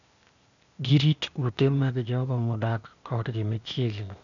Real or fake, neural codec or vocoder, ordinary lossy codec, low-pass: fake; codec, 16 kHz, 0.8 kbps, ZipCodec; none; 7.2 kHz